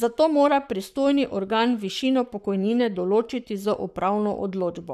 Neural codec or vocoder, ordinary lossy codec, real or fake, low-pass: codec, 44.1 kHz, 7.8 kbps, Pupu-Codec; none; fake; 14.4 kHz